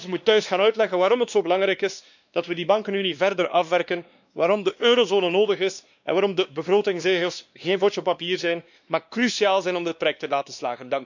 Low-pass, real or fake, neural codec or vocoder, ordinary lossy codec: 7.2 kHz; fake; codec, 16 kHz, 2 kbps, X-Codec, WavLM features, trained on Multilingual LibriSpeech; none